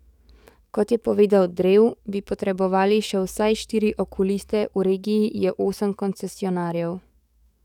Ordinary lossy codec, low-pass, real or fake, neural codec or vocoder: none; 19.8 kHz; fake; codec, 44.1 kHz, 7.8 kbps, DAC